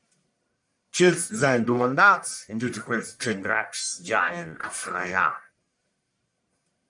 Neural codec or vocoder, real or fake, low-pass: codec, 44.1 kHz, 1.7 kbps, Pupu-Codec; fake; 10.8 kHz